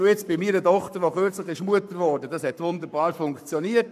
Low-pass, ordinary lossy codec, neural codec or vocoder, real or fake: 14.4 kHz; none; codec, 44.1 kHz, 7.8 kbps, Pupu-Codec; fake